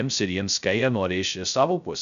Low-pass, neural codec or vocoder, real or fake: 7.2 kHz; codec, 16 kHz, 0.2 kbps, FocalCodec; fake